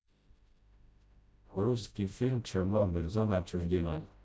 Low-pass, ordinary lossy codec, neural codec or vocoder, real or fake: none; none; codec, 16 kHz, 0.5 kbps, FreqCodec, smaller model; fake